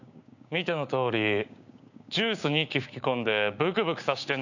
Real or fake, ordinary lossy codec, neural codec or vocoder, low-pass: fake; none; codec, 16 kHz, 6 kbps, DAC; 7.2 kHz